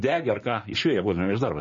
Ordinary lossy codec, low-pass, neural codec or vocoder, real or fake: MP3, 32 kbps; 7.2 kHz; codec, 16 kHz, 6 kbps, DAC; fake